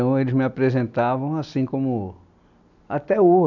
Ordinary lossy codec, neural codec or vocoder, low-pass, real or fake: none; none; 7.2 kHz; real